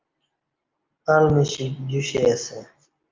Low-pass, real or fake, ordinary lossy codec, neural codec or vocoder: 7.2 kHz; real; Opus, 24 kbps; none